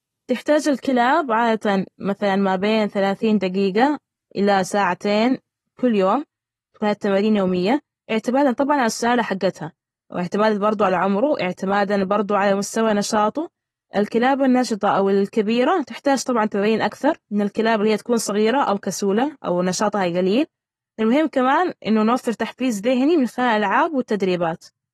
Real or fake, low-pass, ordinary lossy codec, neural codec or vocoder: real; 14.4 kHz; AAC, 32 kbps; none